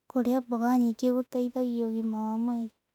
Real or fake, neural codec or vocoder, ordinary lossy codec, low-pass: fake; autoencoder, 48 kHz, 32 numbers a frame, DAC-VAE, trained on Japanese speech; none; 19.8 kHz